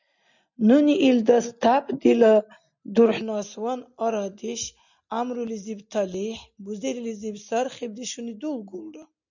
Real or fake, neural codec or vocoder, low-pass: real; none; 7.2 kHz